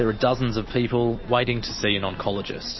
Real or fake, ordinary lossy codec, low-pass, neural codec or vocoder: real; MP3, 24 kbps; 7.2 kHz; none